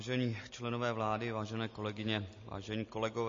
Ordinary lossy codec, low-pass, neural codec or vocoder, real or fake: MP3, 32 kbps; 7.2 kHz; none; real